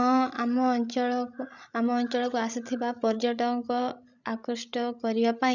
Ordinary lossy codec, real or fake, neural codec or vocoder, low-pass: none; fake; codec, 16 kHz, 16 kbps, FreqCodec, larger model; 7.2 kHz